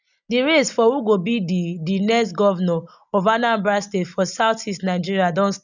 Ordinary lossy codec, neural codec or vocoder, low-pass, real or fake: none; none; 7.2 kHz; real